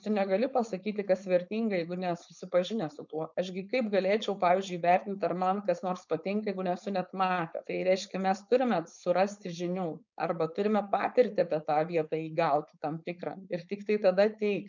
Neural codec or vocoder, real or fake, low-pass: codec, 16 kHz, 4.8 kbps, FACodec; fake; 7.2 kHz